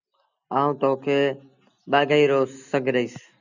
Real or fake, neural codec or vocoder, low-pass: real; none; 7.2 kHz